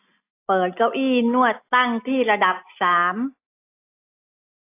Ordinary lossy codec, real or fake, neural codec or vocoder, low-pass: AAC, 24 kbps; real; none; 3.6 kHz